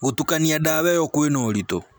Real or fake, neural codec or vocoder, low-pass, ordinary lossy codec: real; none; none; none